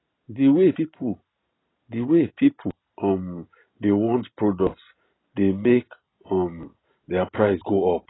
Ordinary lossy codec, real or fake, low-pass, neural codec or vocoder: AAC, 16 kbps; real; 7.2 kHz; none